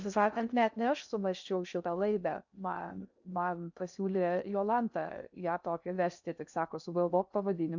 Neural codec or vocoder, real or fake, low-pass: codec, 16 kHz in and 24 kHz out, 0.6 kbps, FocalCodec, streaming, 2048 codes; fake; 7.2 kHz